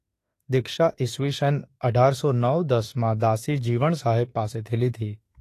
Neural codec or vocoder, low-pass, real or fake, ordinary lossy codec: autoencoder, 48 kHz, 32 numbers a frame, DAC-VAE, trained on Japanese speech; 14.4 kHz; fake; AAC, 48 kbps